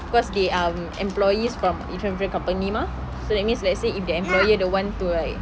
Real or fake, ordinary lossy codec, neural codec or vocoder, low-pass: real; none; none; none